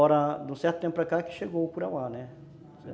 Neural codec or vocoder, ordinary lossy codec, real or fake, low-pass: none; none; real; none